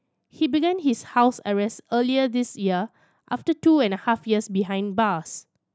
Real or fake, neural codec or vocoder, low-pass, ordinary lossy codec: real; none; none; none